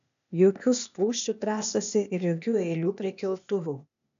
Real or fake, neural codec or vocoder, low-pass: fake; codec, 16 kHz, 0.8 kbps, ZipCodec; 7.2 kHz